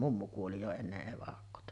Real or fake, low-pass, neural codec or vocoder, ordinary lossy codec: real; 10.8 kHz; none; none